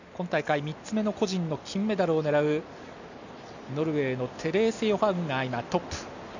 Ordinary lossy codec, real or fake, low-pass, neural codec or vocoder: none; real; 7.2 kHz; none